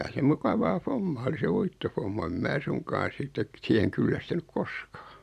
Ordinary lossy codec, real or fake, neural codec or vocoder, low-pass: none; real; none; 14.4 kHz